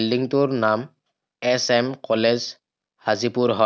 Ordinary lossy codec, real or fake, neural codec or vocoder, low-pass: none; real; none; none